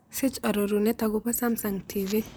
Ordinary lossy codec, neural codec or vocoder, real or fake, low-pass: none; vocoder, 44.1 kHz, 128 mel bands, Pupu-Vocoder; fake; none